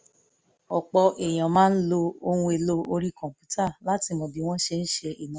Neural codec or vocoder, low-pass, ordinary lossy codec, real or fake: none; none; none; real